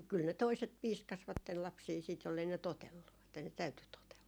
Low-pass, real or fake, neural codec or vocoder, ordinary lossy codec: none; real; none; none